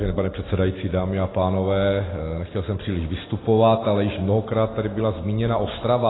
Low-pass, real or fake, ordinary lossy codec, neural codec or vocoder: 7.2 kHz; real; AAC, 16 kbps; none